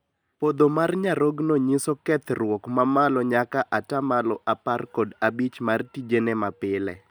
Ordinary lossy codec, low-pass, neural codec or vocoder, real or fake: none; none; none; real